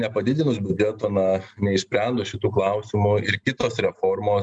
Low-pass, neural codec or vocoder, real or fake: 9.9 kHz; none; real